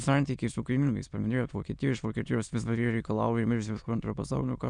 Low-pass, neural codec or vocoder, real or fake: 9.9 kHz; autoencoder, 22.05 kHz, a latent of 192 numbers a frame, VITS, trained on many speakers; fake